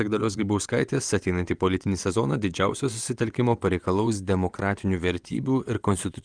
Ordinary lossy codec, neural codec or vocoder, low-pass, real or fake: Opus, 32 kbps; vocoder, 22.05 kHz, 80 mel bands, WaveNeXt; 9.9 kHz; fake